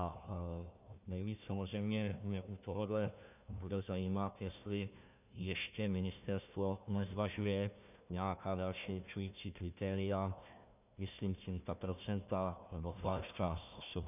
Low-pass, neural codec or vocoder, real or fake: 3.6 kHz; codec, 16 kHz, 1 kbps, FunCodec, trained on Chinese and English, 50 frames a second; fake